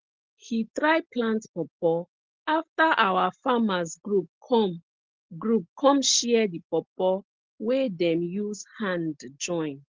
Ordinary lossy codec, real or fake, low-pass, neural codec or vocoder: Opus, 16 kbps; real; 7.2 kHz; none